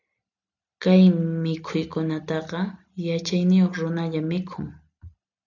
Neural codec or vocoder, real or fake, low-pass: none; real; 7.2 kHz